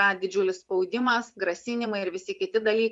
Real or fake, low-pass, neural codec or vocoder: fake; 10.8 kHz; vocoder, 24 kHz, 100 mel bands, Vocos